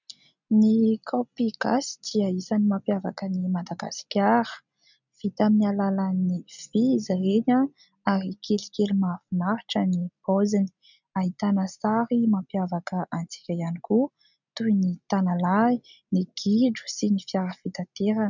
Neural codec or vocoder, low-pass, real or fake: none; 7.2 kHz; real